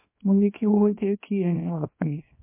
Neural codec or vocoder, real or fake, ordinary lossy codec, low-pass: codec, 24 kHz, 0.9 kbps, WavTokenizer, small release; fake; MP3, 24 kbps; 3.6 kHz